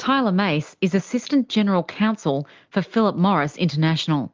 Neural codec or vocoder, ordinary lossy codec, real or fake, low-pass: none; Opus, 32 kbps; real; 7.2 kHz